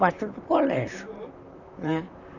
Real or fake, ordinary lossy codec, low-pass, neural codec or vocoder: fake; none; 7.2 kHz; vocoder, 44.1 kHz, 128 mel bands, Pupu-Vocoder